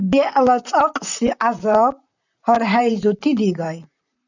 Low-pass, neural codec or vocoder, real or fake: 7.2 kHz; vocoder, 44.1 kHz, 128 mel bands, Pupu-Vocoder; fake